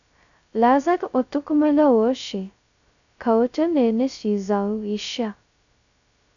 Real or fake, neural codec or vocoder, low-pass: fake; codec, 16 kHz, 0.2 kbps, FocalCodec; 7.2 kHz